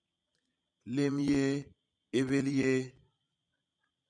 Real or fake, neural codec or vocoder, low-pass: fake; vocoder, 22.05 kHz, 80 mel bands, Vocos; 9.9 kHz